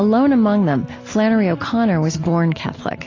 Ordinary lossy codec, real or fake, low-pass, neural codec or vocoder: AAC, 32 kbps; real; 7.2 kHz; none